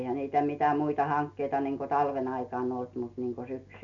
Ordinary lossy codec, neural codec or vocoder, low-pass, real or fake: none; none; 7.2 kHz; real